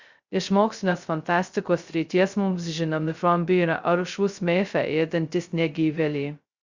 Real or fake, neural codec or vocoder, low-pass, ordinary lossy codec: fake; codec, 16 kHz, 0.2 kbps, FocalCodec; 7.2 kHz; Opus, 64 kbps